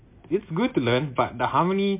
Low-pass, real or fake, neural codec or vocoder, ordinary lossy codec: 3.6 kHz; real; none; MP3, 24 kbps